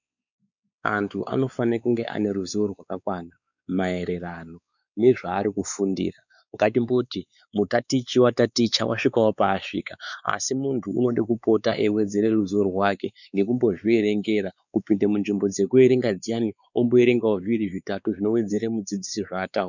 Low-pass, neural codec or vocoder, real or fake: 7.2 kHz; codec, 16 kHz, 4 kbps, X-Codec, WavLM features, trained on Multilingual LibriSpeech; fake